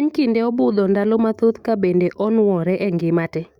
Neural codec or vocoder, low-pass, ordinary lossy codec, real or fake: autoencoder, 48 kHz, 128 numbers a frame, DAC-VAE, trained on Japanese speech; 19.8 kHz; Opus, 64 kbps; fake